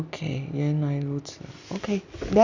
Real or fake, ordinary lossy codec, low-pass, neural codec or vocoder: real; Opus, 64 kbps; 7.2 kHz; none